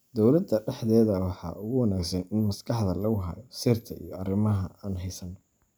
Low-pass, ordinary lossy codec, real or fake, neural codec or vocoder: none; none; real; none